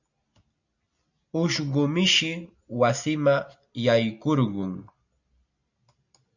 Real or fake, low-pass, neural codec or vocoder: real; 7.2 kHz; none